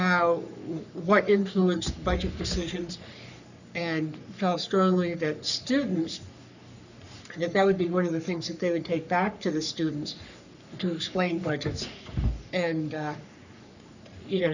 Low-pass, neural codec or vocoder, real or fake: 7.2 kHz; codec, 44.1 kHz, 3.4 kbps, Pupu-Codec; fake